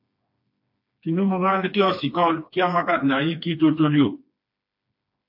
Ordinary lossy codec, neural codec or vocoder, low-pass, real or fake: MP3, 32 kbps; codec, 16 kHz, 2 kbps, FreqCodec, smaller model; 5.4 kHz; fake